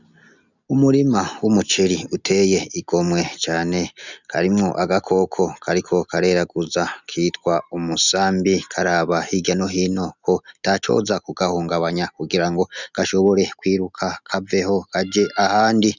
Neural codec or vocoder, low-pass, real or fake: none; 7.2 kHz; real